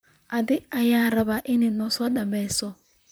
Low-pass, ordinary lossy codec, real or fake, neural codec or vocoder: none; none; fake; vocoder, 44.1 kHz, 128 mel bands, Pupu-Vocoder